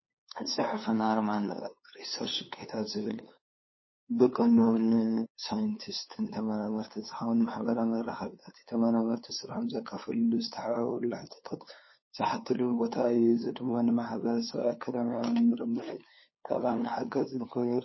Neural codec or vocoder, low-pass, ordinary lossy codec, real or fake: codec, 16 kHz, 2 kbps, FunCodec, trained on LibriTTS, 25 frames a second; 7.2 kHz; MP3, 24 kbps; fake